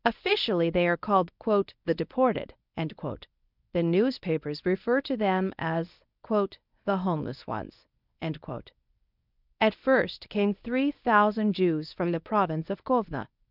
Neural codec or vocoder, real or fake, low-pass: codec, 24 kHz, 0.9 kbps, WavTokenizer, medium speech release version 1; fake; 5.4 kHz